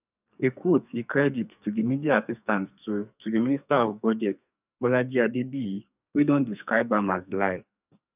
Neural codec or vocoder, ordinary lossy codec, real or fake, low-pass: codec, 44.1 kHz, 2.6 kbps, SNAC; none; fake; 3.6 kHz